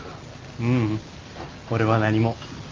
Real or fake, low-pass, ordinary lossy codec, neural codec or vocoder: real; 7.2 kHz; Opus, 32 kbps; none